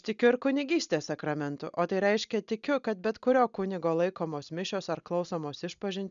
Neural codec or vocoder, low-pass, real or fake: none; 7.2 kHz; real